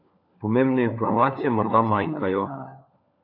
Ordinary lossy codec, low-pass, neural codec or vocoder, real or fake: AAC, 32 kbps; 5.4 kHz; codec, 16 kHz, 4 kbps, FunCodec, trained on LibriTTS, 50 frames a second; fake